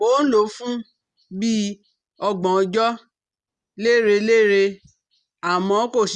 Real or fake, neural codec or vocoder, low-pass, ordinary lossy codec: real; none; 10.8 kHz; Opus, 64 kbps